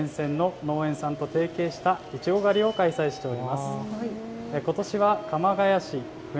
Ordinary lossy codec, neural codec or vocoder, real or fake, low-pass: none; none; real; none